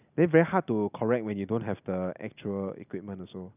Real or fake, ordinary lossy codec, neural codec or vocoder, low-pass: real; none; none; 3.6 kHz